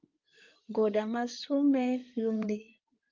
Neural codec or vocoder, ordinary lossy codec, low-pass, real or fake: codec, 16 kHz in and 24 kHz out, 2.2 kbps, FireRedTTS-2 codec; Opus, 32 kbps; 7.2 kHz; fake